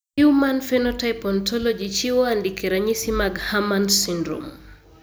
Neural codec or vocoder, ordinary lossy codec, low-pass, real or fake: none; none; none; real